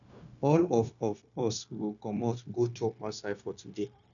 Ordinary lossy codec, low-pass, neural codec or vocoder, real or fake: none; 7.2 kHz; codec, 16 kHz, 0.9 kbps, LongCat-Audio-Codec; fake